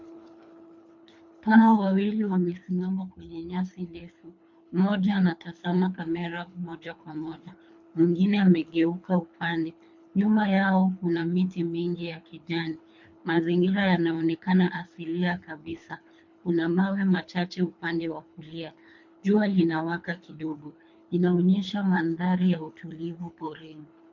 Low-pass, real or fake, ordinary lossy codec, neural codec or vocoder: 7.2 kHz; fake; MP3, 48 kbps; codec, 24 kHz, 3 kbps, HILCodec